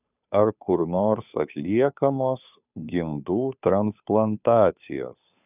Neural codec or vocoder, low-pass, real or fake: codec, 16 kHz, 2 kbps, FunCodec, trained on Chinese and English, 25 frames a second; 3.6 kHz; fake